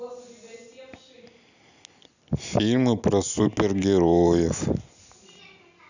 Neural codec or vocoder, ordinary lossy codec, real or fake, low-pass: none; none; real; 7.2 kHz